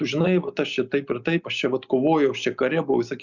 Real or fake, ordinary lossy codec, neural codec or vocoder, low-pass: real; Opus, 64 kbps; none; 7.2 kHz